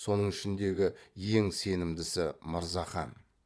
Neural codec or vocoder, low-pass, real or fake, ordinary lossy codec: vocoder, 22.05 kHz, 80 mel bands, Vocos; none; fake; none